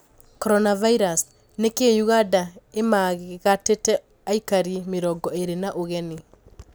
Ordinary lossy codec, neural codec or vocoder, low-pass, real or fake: none; none; none; real